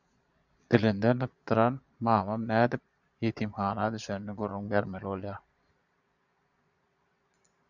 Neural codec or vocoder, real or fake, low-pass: vocoder, 22.05 kHz, 80 mel bands, Vocos; fake; 7.2 kHz